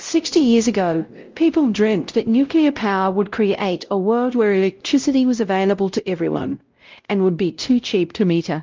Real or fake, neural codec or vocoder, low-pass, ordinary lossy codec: fake; codec, 16 kHz, 0.5 kbps, X-Codec, WavLM features, trained on Multilingual LibriSpeech; 7.2 kHz; Opus, 32 kbps